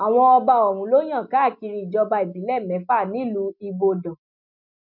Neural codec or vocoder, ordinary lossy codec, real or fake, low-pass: none; none; real; 5.4 kHz